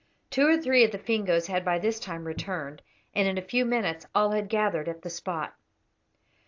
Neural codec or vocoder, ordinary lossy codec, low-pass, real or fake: none; AAC, 48 kbps; 7.2 kHz; real